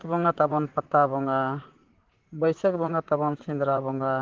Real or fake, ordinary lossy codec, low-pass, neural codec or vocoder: fake; Opus, 16 kbps; 7.2 kHz; vocoder, 44.1 kHz, 128 mel bands, Pupu-Vocoder